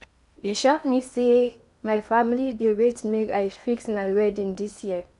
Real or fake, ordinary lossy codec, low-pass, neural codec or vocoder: fake; AAC, 96 kbps; 10.8 kHz; codec, 16 kHz in and 24 kHz out, 0.8 kbps, FocalCodec, streaming, 65536 codes